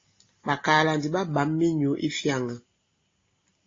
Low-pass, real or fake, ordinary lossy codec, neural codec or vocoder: 7.2 kHz; real; AAC, 32 kbps; none